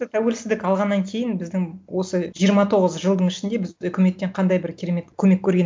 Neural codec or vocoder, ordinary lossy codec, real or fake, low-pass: none; none; real; none